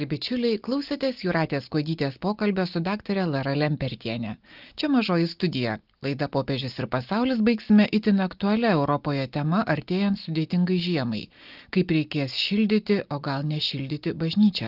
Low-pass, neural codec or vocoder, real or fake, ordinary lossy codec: 5.4 kHz; none; real; Opus, 32 kbps